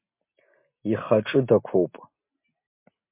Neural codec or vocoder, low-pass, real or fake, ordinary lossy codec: none; 3.6 kHz; real; MP3, 24 kbps